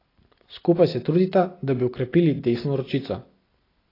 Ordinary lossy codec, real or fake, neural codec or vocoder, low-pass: AAC, 24 kbps; real; none; 5.4 kHz